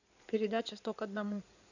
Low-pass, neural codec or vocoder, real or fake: 7.2 kHz; codec, 16 kHz in and 24 kHz out, 2.2 kbps, FireRedTTS-2 codec; fake